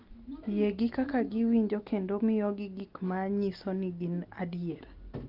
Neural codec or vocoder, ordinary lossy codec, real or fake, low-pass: none; Opus, 24 kbps; real; 5.4 kHz